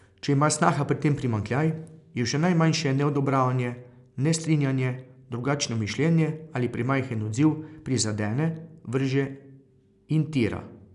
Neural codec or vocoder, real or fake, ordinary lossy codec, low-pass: none; real; none; 10.8 kHz